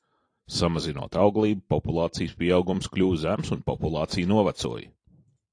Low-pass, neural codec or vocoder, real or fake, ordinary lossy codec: 9.9 kHz; vocoder, 44.1 kHz, 128 mel bands every 256 samples, BigVGAN v2; fake; AAC, 48 kbps